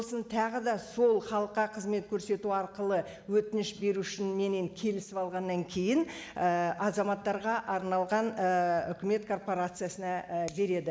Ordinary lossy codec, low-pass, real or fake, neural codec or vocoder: none; none; real; none